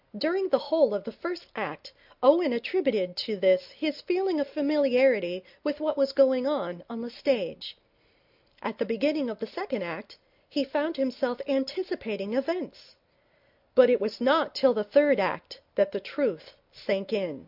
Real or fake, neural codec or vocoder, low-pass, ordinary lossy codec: real; none; 5.4 kHz; MP3, 48 kbps